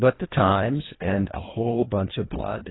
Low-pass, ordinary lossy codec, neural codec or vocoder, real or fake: 7.2 kHz; AAC, 16 kbps; codec, 24 kHz, 1.5 kbps, HILCodec; fake